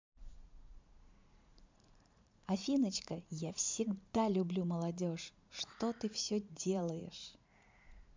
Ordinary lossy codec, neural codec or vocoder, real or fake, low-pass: MP3, 64 kbps; vocoder, 44.1 kHz, 80 mel bands, Vocos; fake; 7.2 kHz